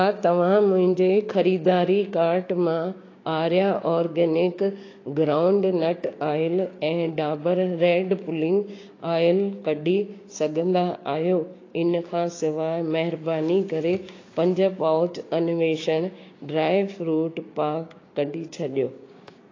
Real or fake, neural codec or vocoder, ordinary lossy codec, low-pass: fake; codec, 16 kHz, 6 kbps, DAC; AAC, 32 kbps; 7.2 kHz